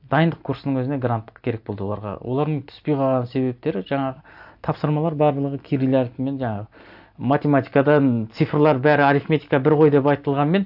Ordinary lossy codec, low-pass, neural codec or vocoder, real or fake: AAC, 48 kbps; 5.4 kHz; none; real